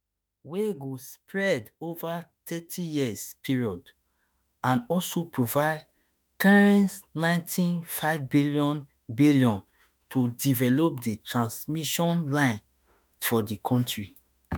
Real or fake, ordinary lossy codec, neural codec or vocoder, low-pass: fake; none; autoencoder, 48 kHz, 32 numbers a frame, DAC-VAE, trained on Japanese speech; none